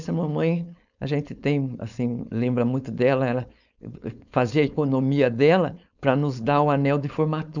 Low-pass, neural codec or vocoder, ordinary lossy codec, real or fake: 7.2 kHz; codec, 16 kHz, 4.8 kbps, FACodec; none; fake